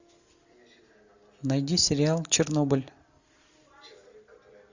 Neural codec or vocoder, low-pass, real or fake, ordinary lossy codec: none; 7.2 kHz; real; Opus, 64 kbps